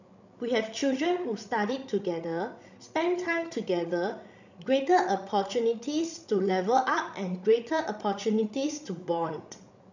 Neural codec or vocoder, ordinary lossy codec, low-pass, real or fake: codec, 16 kHz, 16 kbps, FreqCodec, larger model; none; 7.2 kHz; fake